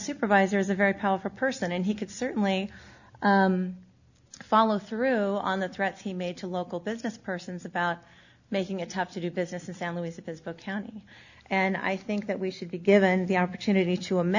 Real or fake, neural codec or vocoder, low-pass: real; none; 7.2 kHz